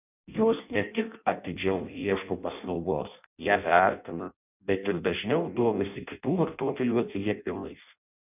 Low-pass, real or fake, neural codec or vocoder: 3.6 kHz; fake; codec, 16 kHz in and 24 kHz out, 0.6 kbps, FireRedTTS-2 codec